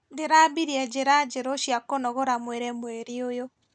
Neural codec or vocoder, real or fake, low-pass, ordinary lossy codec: none; real; none; none